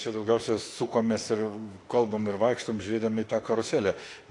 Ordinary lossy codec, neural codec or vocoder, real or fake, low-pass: AAC, 48 kbps; autoencoder, 48 kHz, 32 numbers a frame, DAC-VAE, trained on Japanese speech; fake; 10.8 kHz